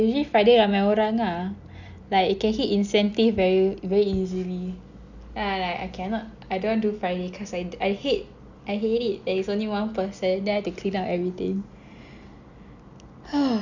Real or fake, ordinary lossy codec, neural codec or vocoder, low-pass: real; none; none; 7.2 kHz